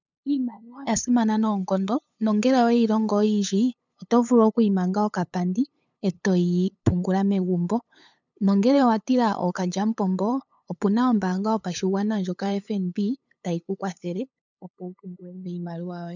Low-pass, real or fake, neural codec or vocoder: 7.2 kHz; fake; codec, 16 kHz, 8 kbps, FunCodec, trained on LibriTTS, 25 frames a second